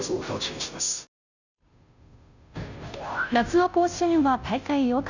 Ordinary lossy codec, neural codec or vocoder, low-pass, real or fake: none; codec, 16 kHz, 0.5 kbps, FunCodec, trained on Chinese and English, 25 frames a second; 7.2 kHz; fake